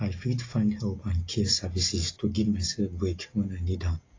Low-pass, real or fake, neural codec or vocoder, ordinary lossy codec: 7.2 kHz; real; none; AAC, 32 kbps